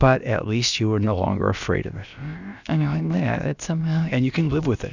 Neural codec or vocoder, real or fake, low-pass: codec, 16 kHz, about 1 kbps, DyCAST, with the encoder's durations; fake; 7.2 kHz